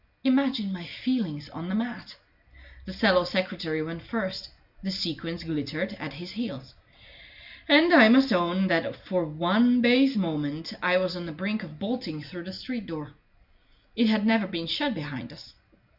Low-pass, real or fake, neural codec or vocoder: 5.4 kHz; real; none